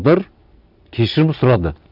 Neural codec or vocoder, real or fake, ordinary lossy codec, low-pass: none; real; none; 5.4 kHz